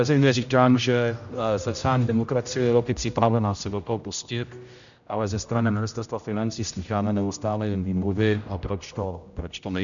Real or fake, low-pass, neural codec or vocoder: fake; 7.2 kHz; codec, 16 kHz, 0.5 kbps, X-Codec, HuBERT features, trained on general audio